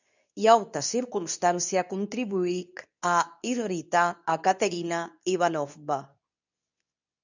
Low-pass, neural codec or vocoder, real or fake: 7.2 kHz; codec, 24 kHz, 0.9 kbps, WavTokenizer, medium speech release version 2; fake